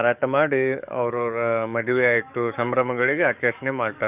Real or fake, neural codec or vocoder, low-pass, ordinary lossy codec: fake; codec, 44.1 kHz, 7.8 kbps, DAC; 3.6 kHz; none